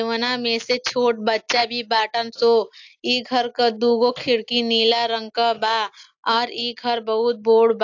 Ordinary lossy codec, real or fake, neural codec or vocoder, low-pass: AAC, 48 kbps; real; none; 7.2 kHz